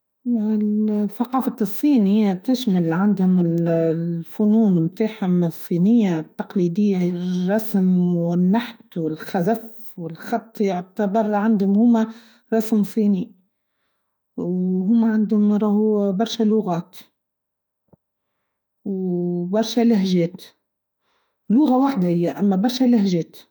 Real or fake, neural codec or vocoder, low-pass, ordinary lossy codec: fake; autoencoder, 48 kHz, 32 numbers a frame, DAC-VAE, trained on Japanese speech; none; none